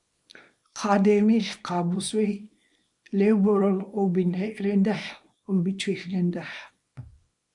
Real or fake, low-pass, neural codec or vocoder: fake; 10.8 kHz; codec, 24 kHz, 0.9 kbps, WavTokenizer, small release